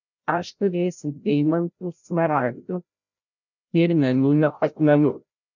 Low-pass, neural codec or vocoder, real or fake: 7.2 kHz; codec, 16 kHz, 0.5 kbps, FreqCodec, larger model; fake